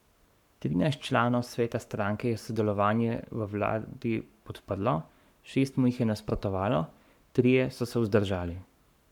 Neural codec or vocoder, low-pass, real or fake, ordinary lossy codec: codec, 44.1 kHz, 7.8 kbps, Pupu-Codec; 19.8 kHz; fake; none